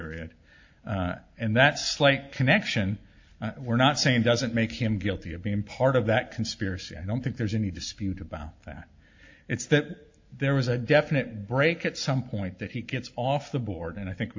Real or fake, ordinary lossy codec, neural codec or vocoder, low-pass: real; AAC, 48 kbps; none; 7.2 kHz